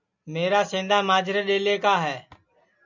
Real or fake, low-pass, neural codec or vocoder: real; 7.2 kHz; none